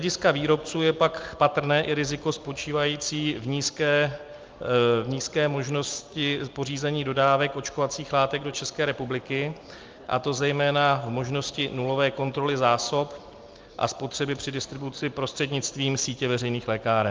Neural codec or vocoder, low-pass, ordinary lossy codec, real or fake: none; 7.2 kHz; Opus, 32 kbps; real